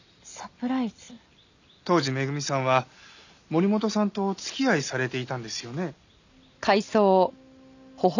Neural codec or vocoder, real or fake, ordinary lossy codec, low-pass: none; real; none; 7.2 kHz